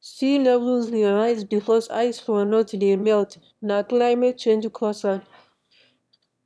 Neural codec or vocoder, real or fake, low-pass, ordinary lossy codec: autoencoder, 22.05 kHz, a latent of 192 numbers a frame, VITS, trained on one speaker; fake; none; none